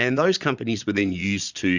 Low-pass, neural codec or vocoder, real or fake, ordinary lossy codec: 7.2 kHz; vocoder, 22.05 kHz, 80 mel bands, Vocos; fake; Opus, 64 kbps